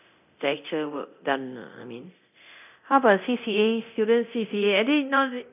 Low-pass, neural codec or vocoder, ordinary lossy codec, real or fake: 3.6 kHz; codec, 24 kHz, 0.9 kbps, DualCodec; none; fake